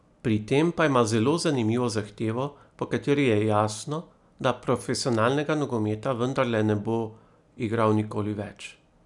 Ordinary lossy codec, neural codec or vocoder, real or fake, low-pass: none; none; real; 10.8 kHz